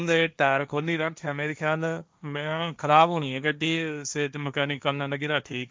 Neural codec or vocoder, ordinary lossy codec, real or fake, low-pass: codec, 16 kHz, 1.1 kbps, Voila-Tokenizer; none; fake; none